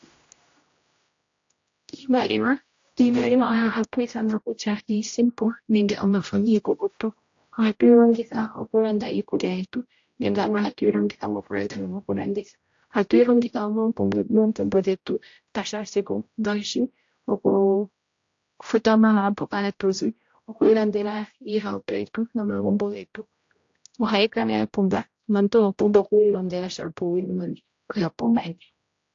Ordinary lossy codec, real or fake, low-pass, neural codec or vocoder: AAC, 48 kbps; fake; 7.2 kHz; codec, 16 kHz, 0.5 kbps, X-Codec, HuBERT features, trained on general audio